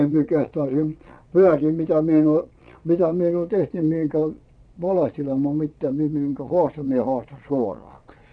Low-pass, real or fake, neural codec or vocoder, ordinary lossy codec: 9.9 kHz; fake; vocoder, 22.05 kHz, 80 mel bands, Vocos; none